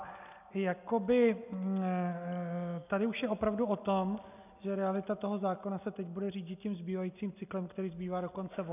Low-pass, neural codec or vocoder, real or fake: 3.6 kHz; none; real